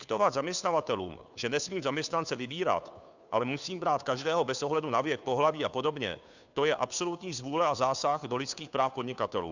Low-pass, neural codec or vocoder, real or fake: 7.2 kHz; codec, 16 kHz, 2 kbps, FunCodec, trained on Chinese and English, 25 frames a second; fake